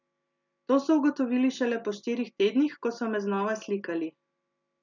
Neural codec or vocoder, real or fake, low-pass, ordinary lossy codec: none; real; 7.2 kHz; none